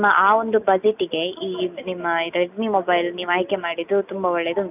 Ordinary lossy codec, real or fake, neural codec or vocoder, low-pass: none; real; none; 3.6 kHz